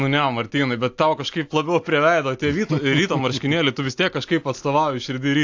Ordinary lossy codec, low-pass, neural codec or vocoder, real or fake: AAC, 48 kbps; 7.2 kHz; none; real